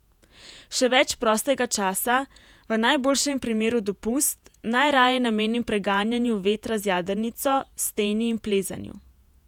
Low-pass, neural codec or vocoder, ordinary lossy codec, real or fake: 19.8 kHz; vocoder, 48 kHz, 128 mel bands, Vocos; none; fake